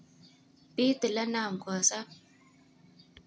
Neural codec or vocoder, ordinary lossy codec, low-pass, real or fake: none; none; none; real